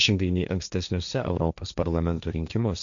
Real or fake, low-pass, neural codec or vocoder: fake; 7.2 kHz; codec, 16 kHz, 1.1 kbps, Voila-Tokenizer